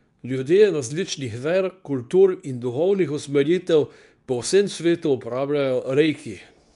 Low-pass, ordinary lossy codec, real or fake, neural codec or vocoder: 10.8 kHz; none; fake; codec, 24 kHz, 0.9 kbps, WavTokenizer, medium speech release version 2